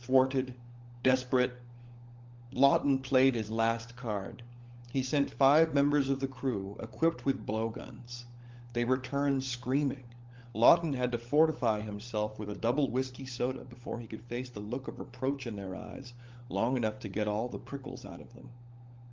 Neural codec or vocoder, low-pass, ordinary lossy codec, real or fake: codec, 16 kHz, 16 kbps, FunCodec, trained on LibriTTS, 50 frames a second; 7.2 kHz; Opus, 32 kbps; fake